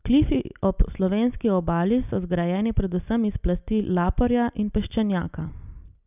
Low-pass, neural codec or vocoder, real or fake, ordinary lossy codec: 3.6 kHz; none; real; none